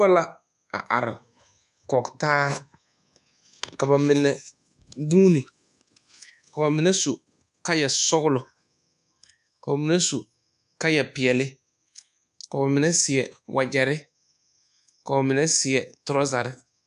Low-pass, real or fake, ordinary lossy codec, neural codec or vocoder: 10.8 kHz; fake; AAC, 96 kbps; codec, 24 kHz, 1.2 kbps, DualCodec